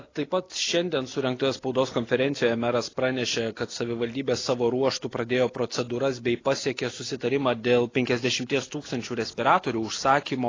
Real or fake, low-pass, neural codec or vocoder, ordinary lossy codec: real; 7.2 kHz; none; AAC, 32 kbps